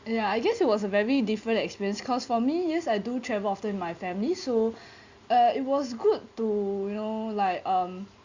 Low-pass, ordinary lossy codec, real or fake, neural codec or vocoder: 7.2 kHz; Opus, 64 kbps; real; none